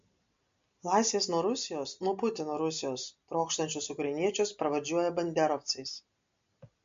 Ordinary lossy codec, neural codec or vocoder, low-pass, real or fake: MP3, 48 kbps; none; 7.2 kHz; real